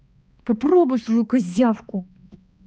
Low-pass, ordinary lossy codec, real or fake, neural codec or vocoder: none; none; fake; codec, 16 kHz, 2 kbps, X-Codec, HuBERT features, trained on balanced general audio